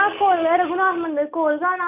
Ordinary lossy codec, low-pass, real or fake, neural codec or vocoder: none; 3.6 kHz; real; none